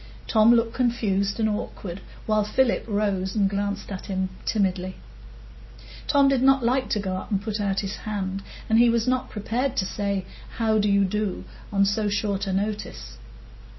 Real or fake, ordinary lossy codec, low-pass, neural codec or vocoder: real; MP3, 24 kbps; 7.2 kHz; none